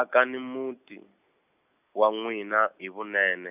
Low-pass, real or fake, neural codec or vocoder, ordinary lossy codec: 3.6 kHz; real; none; none